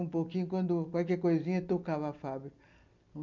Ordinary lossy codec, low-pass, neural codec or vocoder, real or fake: none; 7.2 kHz; none; real